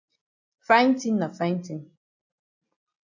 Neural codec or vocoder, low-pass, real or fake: none; 7.2 kHz; real